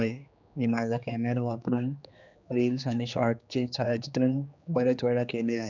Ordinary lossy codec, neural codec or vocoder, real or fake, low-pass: none; codec, 16 kHz, 2 kbps, X-Codec, HuBERT features, trained on general audio; fake; 7.2 kHz